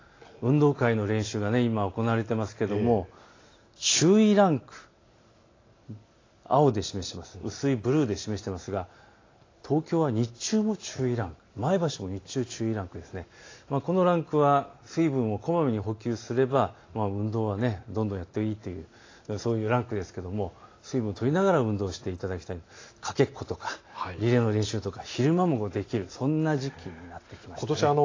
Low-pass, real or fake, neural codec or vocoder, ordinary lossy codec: 7.2 kHz; real; none; AAC, 32 kbps